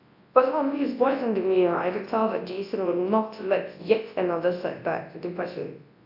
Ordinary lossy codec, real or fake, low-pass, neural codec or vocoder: AAC, 32 kbps; fake; 5.4 kHz; codec, 24 kHz, 0.9 kbps, WavTokenizer, large speech release